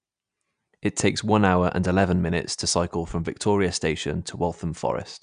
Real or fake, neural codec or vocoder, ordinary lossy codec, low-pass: real; none; none; 9.9 kHz